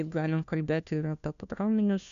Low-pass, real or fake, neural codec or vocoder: 7.2 kHz; fake; codec, 16 kHz, 1 kbps, FunCodec, trained on LibriTTS, 50 frames a second